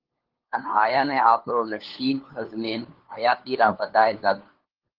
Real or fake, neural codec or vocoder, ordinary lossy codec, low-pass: fake; codec, 16 kHz, 2 kbps, FunCodec, trained on LibriTTS, 25 frames a second; Opus, 16 kbps; 5.4 kHz